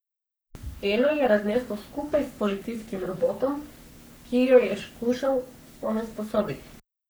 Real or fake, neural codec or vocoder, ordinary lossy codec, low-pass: fake; codec, 44.1 kHz, 3.4 kbps, Pupu-Codec; none; none